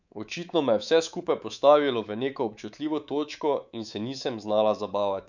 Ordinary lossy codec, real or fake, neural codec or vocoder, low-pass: none; fake; codec, 24 kHz, 3.1 kbps, DualCodec; 7.2 kHz